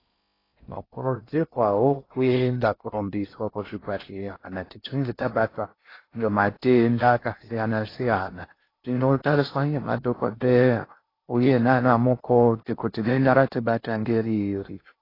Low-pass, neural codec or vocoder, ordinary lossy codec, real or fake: 5.4 kHz; codec, 16 kHz in and 24 kHz out, 0.6 kbps, FocalCodec, streaming, 4096 codes; AAC, 24 kbps; fake